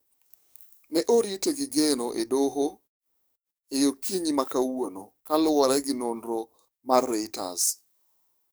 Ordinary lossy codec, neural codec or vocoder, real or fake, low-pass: none; codec, 44.1 kHz, 7.8 kbps, DAC; fake; none